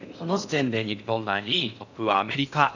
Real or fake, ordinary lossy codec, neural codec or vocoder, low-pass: fake; none; codec, 16 kHz in and 24 kHz out, 0.6 kbps, FocalCodec, streaming, 4096 codes; 7.2 kHz